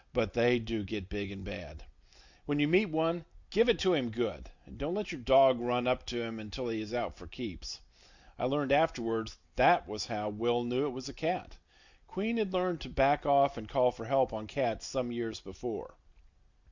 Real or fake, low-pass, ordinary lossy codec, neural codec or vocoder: real; 7.2 kHz; Opus, 64 kbps; none